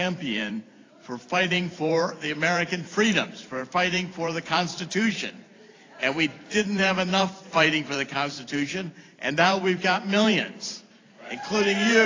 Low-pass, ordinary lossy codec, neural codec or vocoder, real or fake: 7.2 kHz; AAC, 32 kbps; vocoder, 44.1 kHz, 128 mel bands every 512 samples, BigVGAN v2; fake